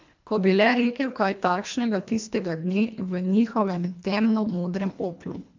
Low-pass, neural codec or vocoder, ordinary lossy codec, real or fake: 7.2 kHz; codec, 24 kHz, 1.5 kbps, HILCodec; MP3, 64 kbps; fake